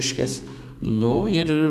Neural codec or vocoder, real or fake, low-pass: codec, 32 kHz, 1.9 kbps, SNAC; fake; 14.4 kHz